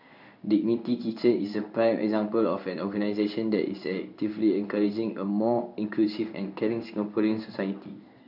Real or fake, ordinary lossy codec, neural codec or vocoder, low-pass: fake; none; codec, 16 kHz in and 24 kHz out, 1 kbps, XY-Tokenizer; 5.4 kHz